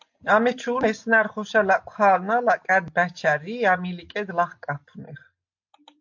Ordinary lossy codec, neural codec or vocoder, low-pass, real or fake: MP3, 48 kbps; none; 7.2 kHz; real